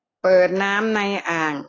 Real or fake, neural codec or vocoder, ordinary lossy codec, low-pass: real; none; AAC, 32 kbps; 7.2 kHz